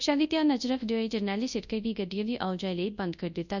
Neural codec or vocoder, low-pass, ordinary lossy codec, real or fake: codec, 24 kHz, 0.9 kbps, WavTokenizer, large speech release; 7.2 kHz; none; fake